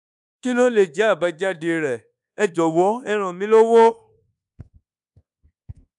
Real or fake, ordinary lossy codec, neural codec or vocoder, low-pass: fake; none; codec, 24 kHz, 1.2 kbps, DualCodec; 10.8 kHz